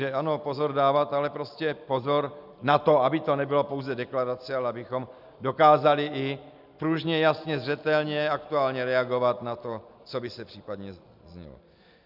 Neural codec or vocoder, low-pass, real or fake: none; 5.4 kHz; real